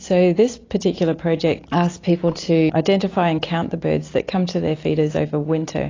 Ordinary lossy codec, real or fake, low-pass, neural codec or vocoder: AAC, 32 kbps; real; 7.2 kHz; none